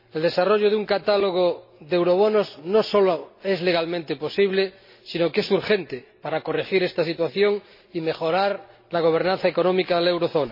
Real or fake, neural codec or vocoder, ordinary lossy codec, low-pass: real; none; MP3, 24 kbps; 5.4 kHz